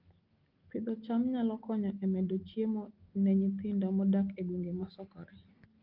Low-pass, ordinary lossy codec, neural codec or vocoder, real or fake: 5.4 kHz; Opus, 32 kbps; none; real